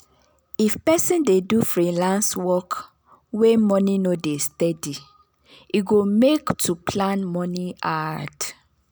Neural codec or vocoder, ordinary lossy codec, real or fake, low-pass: none; none; real; none